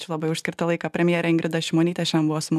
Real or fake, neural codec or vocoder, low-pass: real; none; 14.4 kHz